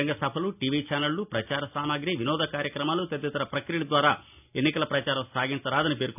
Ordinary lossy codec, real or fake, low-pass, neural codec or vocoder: none; real; 3.6 kHz; none